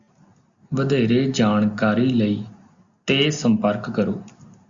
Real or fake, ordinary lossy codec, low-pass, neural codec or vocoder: real; Opus, 64 kbps; 7.2 kHz; none